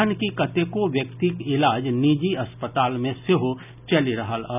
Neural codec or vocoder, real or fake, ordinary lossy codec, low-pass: none; real; none; 3.6 kHz